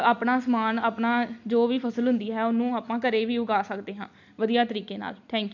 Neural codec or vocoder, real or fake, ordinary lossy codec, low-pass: none; real; none; 7.2 kHz